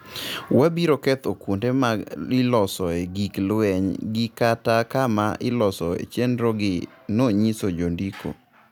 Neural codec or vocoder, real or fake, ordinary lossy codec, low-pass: none; real; none; none